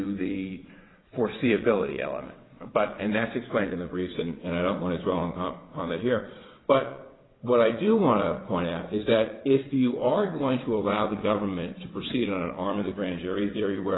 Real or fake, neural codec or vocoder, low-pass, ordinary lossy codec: fake; vocoder, 22.05 kHz, 80 mel bands, WaveNeXt; 7.2 kHz; AAC, 16 kbps